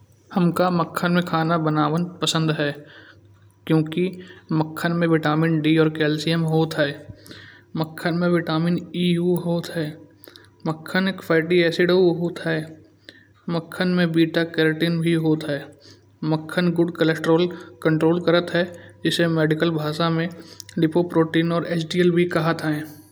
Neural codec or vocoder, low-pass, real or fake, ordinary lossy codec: none; none; real; none